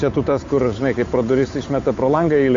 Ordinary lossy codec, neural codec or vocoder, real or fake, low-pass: AAC, 48 kbps; codec, 16 kHz, 8 kbps, FunCodec, trained on Chinese and English, 25 frames a second; fake; 7.2 kHz